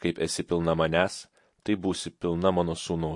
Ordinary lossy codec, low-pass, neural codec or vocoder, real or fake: MP3, 48 kbps; 10.8 kHz; none; real